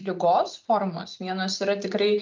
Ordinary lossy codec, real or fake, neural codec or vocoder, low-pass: Opus, 24 kbps; real; none; 7.2 kHz